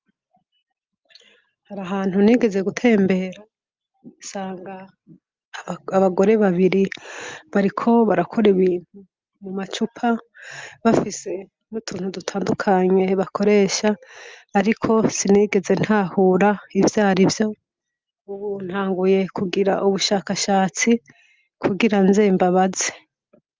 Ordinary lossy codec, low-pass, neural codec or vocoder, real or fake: Opus, 32 kbps; 7.2 kHz; none; real